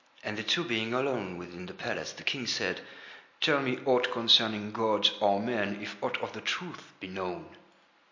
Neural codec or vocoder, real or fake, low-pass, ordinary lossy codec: none; real; 7.2 kHz; MP3, 48 kbps